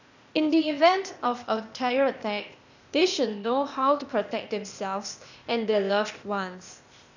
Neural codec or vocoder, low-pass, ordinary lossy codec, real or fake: codec, 16 kHz, 0.8 kbps, ZipCodec; 7.2 kHz; none; fake